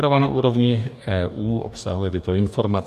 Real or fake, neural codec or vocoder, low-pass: fake; codec, 44.1 kHz, 2.6 kbps, DAC; 14.4 kHz